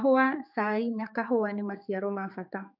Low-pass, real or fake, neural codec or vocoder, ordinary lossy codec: 5.4 kHz; fake; codec, 16 kHz, 4 kbps, X-Codec, HuBERT features, trained on general audio; MP3, 48 kbps